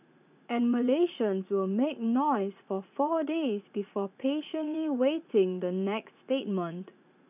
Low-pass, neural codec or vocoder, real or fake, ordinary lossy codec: 3.6 kHz; vocoder, 44.1 kHz, 80 mel bands, Vocos; fake; none